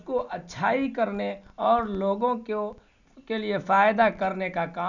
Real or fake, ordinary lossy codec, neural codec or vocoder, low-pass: real; none; none; 7.2 kHz